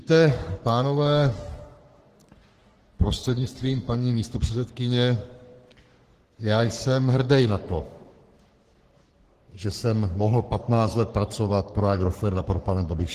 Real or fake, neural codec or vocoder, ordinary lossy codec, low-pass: fake; codec, 44.1 kHz, 3.4 kbps, Pupu-Codec; Opus, 16 kbps; 14.4 kHz